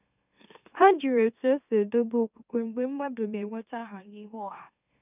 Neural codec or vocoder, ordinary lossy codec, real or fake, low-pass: autoencoder, 44.1 kHz, a latent of 192 numbers a frame, MeloTTS; none; fake; 3.6 kHz